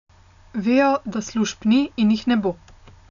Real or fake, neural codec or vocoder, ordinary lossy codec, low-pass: real; none; none; 7.2 kHz